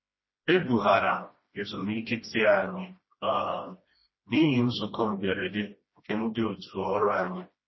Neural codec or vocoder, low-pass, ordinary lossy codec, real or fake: codec, 16 kHz, 1 kbps, FreqCodec, smaller model; 7.2 kHz; MP3, 24 kbps; fake